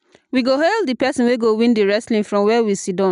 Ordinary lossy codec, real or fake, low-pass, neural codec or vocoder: none; real; 10.8 kHz; none